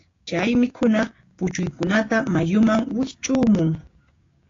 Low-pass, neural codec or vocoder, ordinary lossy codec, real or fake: 7.2 kHz; codec, 16 kHz, 6 kbps, DAC; AAC, 32 kbps; fake